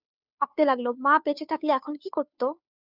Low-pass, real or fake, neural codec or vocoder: 5.4 kHz; fake; codec, 16 kHz, 2 kbps, FunCodec, trained on Chinese and English, 25 frames a second